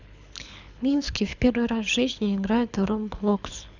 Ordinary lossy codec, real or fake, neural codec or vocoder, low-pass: none; fake; codec, 24 kHz, 6 kbps, HILCodec; 7.2 kHz